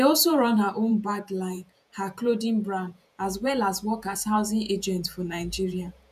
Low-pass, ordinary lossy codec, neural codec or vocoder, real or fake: 14.4 kHz; none; vocoder, 48 kHz, 128 mel bands, Vocos; fake